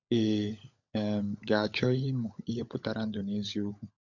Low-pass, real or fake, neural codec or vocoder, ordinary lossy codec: 7.2 kHz; fake; codec, 16 kHz, 16 kbps, FunCodec, trained on LibriTTS, 50 frames a second; none